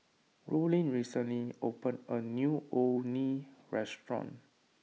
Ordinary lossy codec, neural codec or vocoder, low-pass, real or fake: none; none; none; real